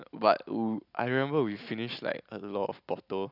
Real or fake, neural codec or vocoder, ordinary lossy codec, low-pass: real; none; none; 5.4 kHz